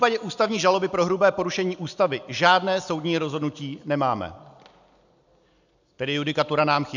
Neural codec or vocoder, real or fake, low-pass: none; real; 7.2 kHz